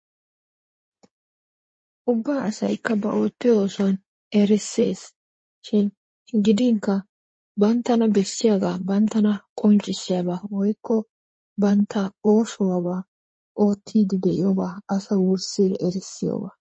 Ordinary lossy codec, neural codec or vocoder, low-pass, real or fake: MP3, 32 kbps; codec, 16 kHz in and 24 kHz out, 2.2 kbps, FireRedTTS-2 codec; 9.9 kHz; fake